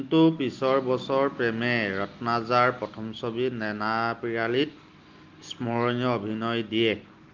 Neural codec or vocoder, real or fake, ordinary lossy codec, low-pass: none; real; Opus, 32 kbps; 7.2 kHz